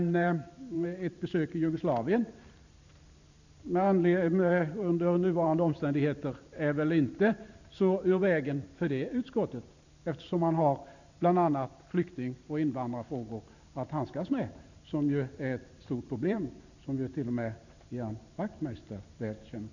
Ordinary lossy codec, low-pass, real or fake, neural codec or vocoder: none; 7.2 kHz; fake; vocoder, 44.1 kHz, 128 mel bands every 512 samples, BigVGAN v2